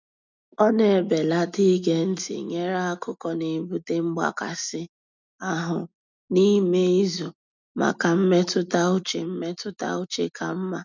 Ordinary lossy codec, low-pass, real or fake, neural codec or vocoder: none; 7.2 kHz; real; none